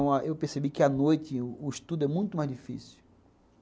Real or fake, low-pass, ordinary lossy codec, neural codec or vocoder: real; none; none; none